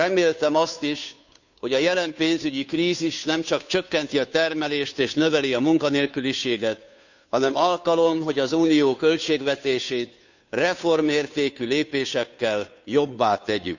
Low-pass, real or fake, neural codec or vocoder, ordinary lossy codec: 7.2 kHz; fake; codec, 16 kHz, 2 kbps, FunCodec, trained on Chinese and English, 25 frames a second; none